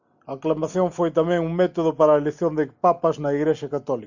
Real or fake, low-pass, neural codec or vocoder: real; 7.2 kHz; none